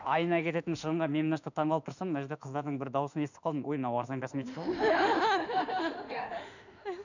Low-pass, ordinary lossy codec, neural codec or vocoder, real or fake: 7.2 kHz; none; autoencoder, 48 kHz, 32 numbers a frame, DAC-VAE, trained on Japanese speech; fake